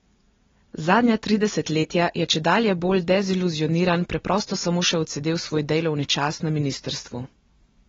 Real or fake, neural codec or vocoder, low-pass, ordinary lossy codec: real; none; 7.2 kHz; AAC, 24 kbps